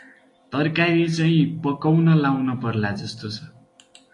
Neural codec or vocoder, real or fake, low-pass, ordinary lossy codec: none; real; 10.8 kHz; AAC, 48 kbps